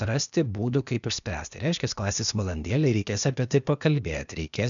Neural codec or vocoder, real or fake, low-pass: codec, 16 kHz, 0.8 kbps, ZipCodec; fake; 7.2 kHz